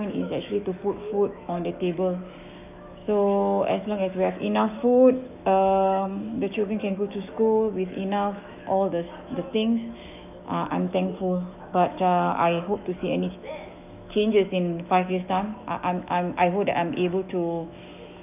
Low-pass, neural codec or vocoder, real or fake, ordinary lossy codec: 3.6 kHz; autoencoder, 48 kHz, 128 numbers a frame, DAC-VAE, trained on Japanese speech; fake; none